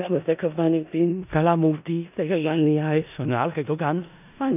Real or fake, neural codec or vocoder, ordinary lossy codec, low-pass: fake; codec, 16 kHz in and 24 kHz out, 0.4 kbps, LongCat-Audio-Codec, four codebook decoder; none; 3.6 kHz